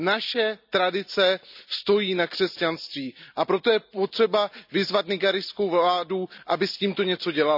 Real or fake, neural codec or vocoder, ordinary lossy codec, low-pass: real; none; none; 5.4 kHz